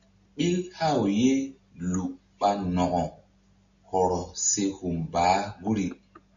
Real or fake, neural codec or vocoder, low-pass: real; none; 7.2 kHz